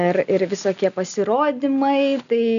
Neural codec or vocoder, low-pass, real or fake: none; 7.2 kHz; real